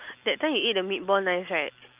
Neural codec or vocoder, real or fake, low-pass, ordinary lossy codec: none; real; 3.6 kHz; Opus, 32 kbps